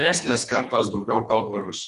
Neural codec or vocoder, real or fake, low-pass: codec, 24 kHz, 1.5 kbps, HILCodec; fake; 10.8 kHz